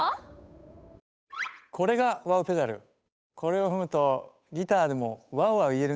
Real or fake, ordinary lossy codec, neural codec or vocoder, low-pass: fake; none; codec, 16 kHz, 8 kbps, FunCodec, trained on Chinese and English, 25 frames a second; none